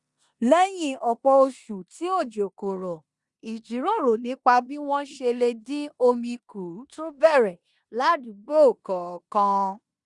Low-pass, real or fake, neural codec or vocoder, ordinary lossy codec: 10.8 kHz; fake; codec, 16 kHz in and 24 kHz out, 0.9 kbps, LongCat-Audio-Codec, four codebook decoder; Opus, 64 kbps